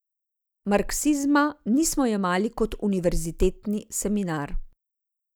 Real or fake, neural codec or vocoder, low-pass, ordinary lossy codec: real; none; none; none